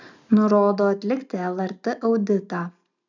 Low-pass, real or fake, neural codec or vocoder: 7.2 kHz; real; none